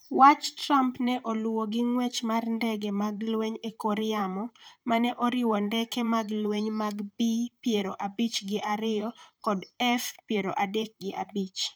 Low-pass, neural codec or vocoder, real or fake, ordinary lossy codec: none; vocoder, 44.1 kHz, 128 mel bands, Pupu-Vocoder; fake; none